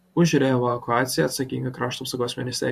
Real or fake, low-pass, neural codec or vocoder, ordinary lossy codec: fake; 14.4 kHz; vocoder, 44.1 kHz, 128 mel bands every 512 samples, BigVGAN v2; MP3, 64 kbps